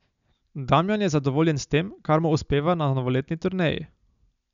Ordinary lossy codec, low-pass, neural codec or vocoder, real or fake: none; 7.2 kHz; none; real